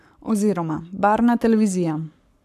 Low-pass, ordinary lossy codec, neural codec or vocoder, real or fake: 14.4 kHz; none; codec, 44.1 kHz, 7.8 kbps, Pupu-Codec; fake